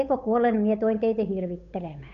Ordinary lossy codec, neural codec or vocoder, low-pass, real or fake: none; codec, 16 kHz, 8 kbps, FunCodec, trained on Chinese and English, 25 frames a second; 7.2 kHz; fake